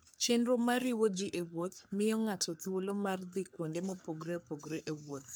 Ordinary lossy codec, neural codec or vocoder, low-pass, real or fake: none; codec, 44.1 kHz, 3.4 kbps, Pupu-Codec; none; fake